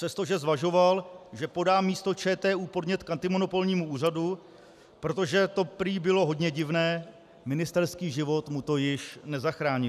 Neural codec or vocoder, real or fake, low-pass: none; real; 14.4 kHz